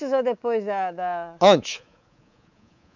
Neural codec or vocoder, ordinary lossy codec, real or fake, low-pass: codec, 24 kHz, 3.1 kbps, DualCodec; none; fake; 7.2 kHz